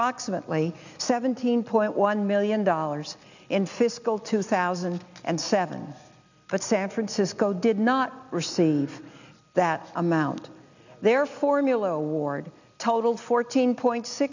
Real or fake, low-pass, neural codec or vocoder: real; 7.2 kHz; none